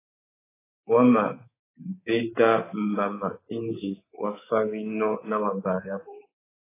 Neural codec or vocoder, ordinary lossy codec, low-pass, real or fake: codec, 24 kHz, 3.1 kbps, DualCodec; AAC, 16 kbps; 3.6 kHz; fake